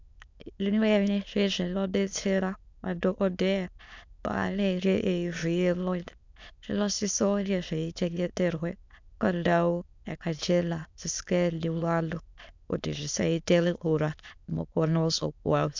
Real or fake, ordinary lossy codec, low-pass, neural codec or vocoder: fake; MP3, 64 kbps; 7.2 kHz; autoencoder, 22.05 kHz, a latent of 192 numbers a frame, VITS, trained on many speakers